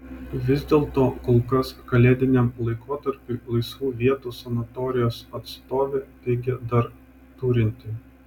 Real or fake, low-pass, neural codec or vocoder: real; 19.8 kHz; none